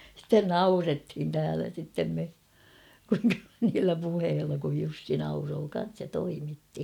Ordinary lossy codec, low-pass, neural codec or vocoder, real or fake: none; 19.8 kHz; none; real